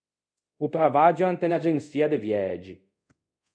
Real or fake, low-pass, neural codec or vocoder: fake; 9.9 kHz; codec, 24 kHz, 0.5 kbps, DualCodec